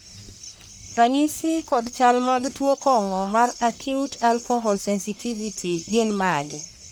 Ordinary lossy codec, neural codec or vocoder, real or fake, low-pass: none; codec, 44.1 kHz, 1.7 kbps, Pupu-Codec; fake; none